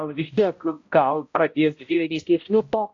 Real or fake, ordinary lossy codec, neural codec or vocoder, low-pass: fake; AAC, 48 kbps; codec, 16 kHz, 0.5 kbps, X-Codec, HuBERT features, trained on balanced general audio; 7.2 kHz